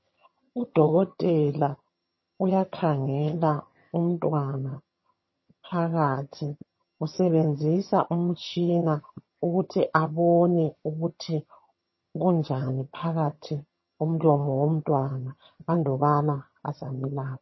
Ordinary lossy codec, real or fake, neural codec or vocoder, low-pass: MP3, 24 kbps; fake; vocoder, 22.05 kHz, 80 mel bands, HiFi-GAN; 7.2 kHz